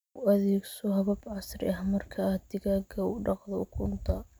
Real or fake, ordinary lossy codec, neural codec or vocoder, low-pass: real; none; none; none